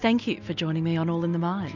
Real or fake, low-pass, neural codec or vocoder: real; 7.2 kHz; none